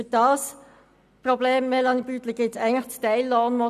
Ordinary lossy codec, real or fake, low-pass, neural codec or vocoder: none; real; 14.4 kHz; none